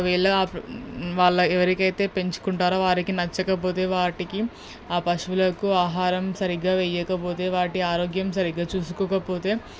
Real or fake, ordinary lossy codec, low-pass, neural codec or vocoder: real; none; none; none